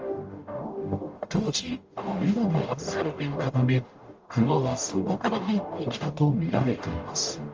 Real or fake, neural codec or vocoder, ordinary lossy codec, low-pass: fake; codec, 44.1 kHz, 0.9 kbps, DAC; Opus, 24 kbps; 7.2 kHz